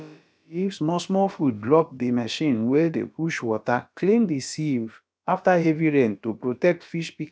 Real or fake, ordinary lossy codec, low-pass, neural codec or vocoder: fake; none; none; codec, 16 kHz, about 1 kbps, DyCAST, with the encoder's durations